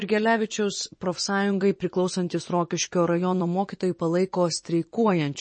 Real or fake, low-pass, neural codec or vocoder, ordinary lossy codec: fake; 9.9 kHz; vocoder, 24 kHz, 100 mel bands, Vocos; MP3, 32 kbps